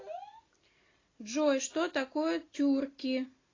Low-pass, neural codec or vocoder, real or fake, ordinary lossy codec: 7.2 kHz; none; real; AAC, 32 kbps